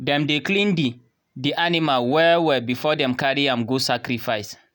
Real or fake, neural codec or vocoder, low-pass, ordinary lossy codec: real; none; none; none